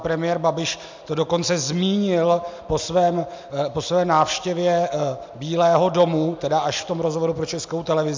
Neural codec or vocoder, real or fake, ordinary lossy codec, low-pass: none; real; MP3, 64 kbps; 7.2 kHz